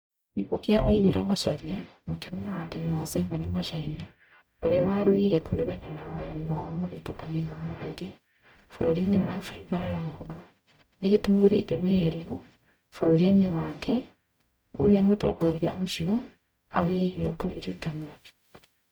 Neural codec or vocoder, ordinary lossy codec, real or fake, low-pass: codec, 44.1 kHz, 0.9 kbps, DAC; none; fake; none